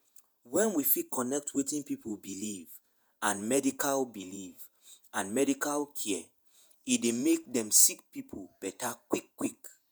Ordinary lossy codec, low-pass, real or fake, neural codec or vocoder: none; none; fake; vocoder, 48 kHz, 128 mel bands, Vocos